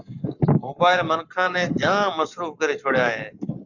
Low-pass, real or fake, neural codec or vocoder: 7.2 kHz; fake; codec, 44.1 kHz, 7.8 kbps, Pupu-Codec